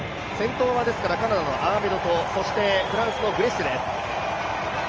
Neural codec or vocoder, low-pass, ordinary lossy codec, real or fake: none; 7.2 kHz; Opus, 24 kbps; real